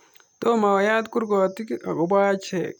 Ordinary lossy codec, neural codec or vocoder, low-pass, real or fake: none; none; 19.8 kHz; real